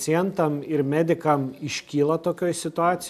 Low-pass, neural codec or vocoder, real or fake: 14.4 kHz; none; real